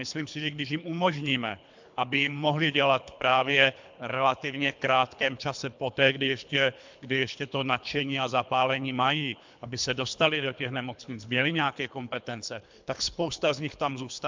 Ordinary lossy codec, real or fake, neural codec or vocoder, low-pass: MP3, 64 kbps; fake; codec, 24 kHz, 3 kbps, HILCodec; 7.2 kHz